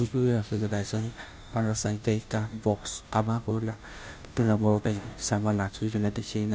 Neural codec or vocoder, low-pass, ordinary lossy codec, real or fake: codec, 16 kHz, 0.5 kbps, FunCodec, trained on Chinese and English, 25 frames a second; none; none; fake